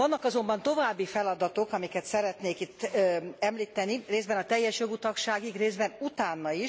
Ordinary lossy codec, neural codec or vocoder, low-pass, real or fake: none; none; none; real